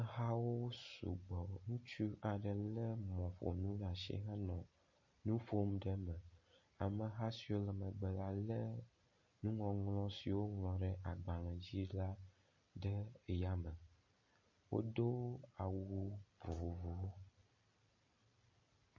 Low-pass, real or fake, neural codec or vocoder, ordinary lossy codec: 7.2 kHz; real; none; MP3, 32 kbps